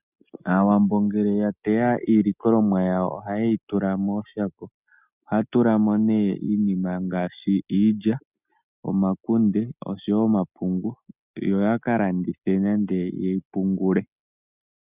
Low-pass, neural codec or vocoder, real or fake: 3.6 kHz; none; real